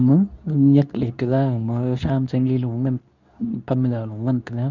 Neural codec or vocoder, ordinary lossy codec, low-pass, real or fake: codec, 24 kHz, 0.9 kbps, WavTokenizer, medium speech release version 2; none; 7.2 kHz; fake